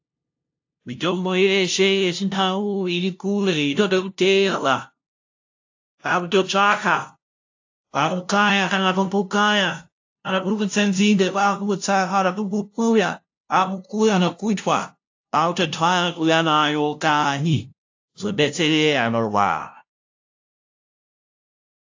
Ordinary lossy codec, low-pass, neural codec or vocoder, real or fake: AAC, 48 kbps; 7.2 kHz; codec, 16 kHz, 0.5 kbps, FunCodec, trained on LibriTTS, 25 frames a second; fake